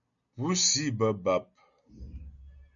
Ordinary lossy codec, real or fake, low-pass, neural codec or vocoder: MP3, 96 kbps; real; 7.2 kHz; none